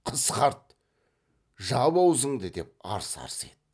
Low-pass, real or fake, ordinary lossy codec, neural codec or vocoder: none; real; none; none